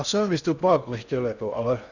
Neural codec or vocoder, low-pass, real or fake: codec, 16 kHz in and 24 kHz out, 0.6 kbps, FocalCodec, streaming, 4096 codes; 7.2 kHz; fake